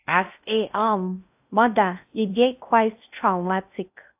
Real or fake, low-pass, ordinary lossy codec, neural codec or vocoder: fake; 3.6 kHz; AAC, 32 kbps; codec, 16 kHz in and 24 kHz out, 0.6 kbps, FocalCodec, streaming, 2048 codes